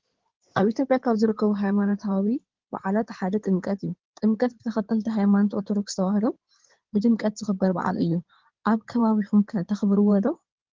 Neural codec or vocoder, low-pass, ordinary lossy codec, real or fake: codec, 16 kHz in and 24 kHz out, 2.2 kbps, FireRedTTS-2 codec; 7.2 kHz; Opus, 16 kbps; fake